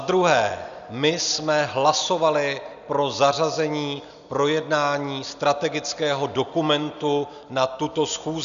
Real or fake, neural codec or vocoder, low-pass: real; none; 7.2 kHz